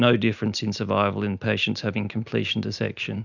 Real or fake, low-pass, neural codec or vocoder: real; 7.2 kHz; none